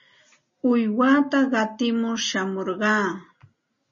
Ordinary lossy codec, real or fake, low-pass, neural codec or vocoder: MP3, 32 kbps; real; 7.2 kHz; none